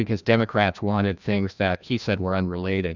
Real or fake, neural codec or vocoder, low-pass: fake; codec, 16 kHz, 1 kbps, FreqCodec, larger model; 7.2 kHz